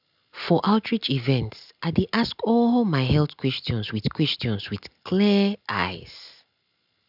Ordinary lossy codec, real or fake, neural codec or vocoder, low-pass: none; real; none; 5.4 kHz